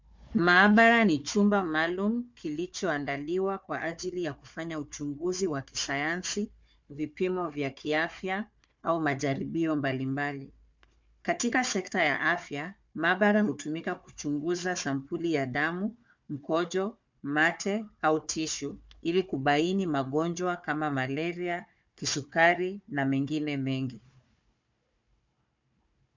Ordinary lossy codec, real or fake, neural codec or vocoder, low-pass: MP3, 64 kbps; fake; codec, 16 kHz, 4 kbps, FunCodec, trained on Chinese and English, 50 frames a second; 7.2 kHz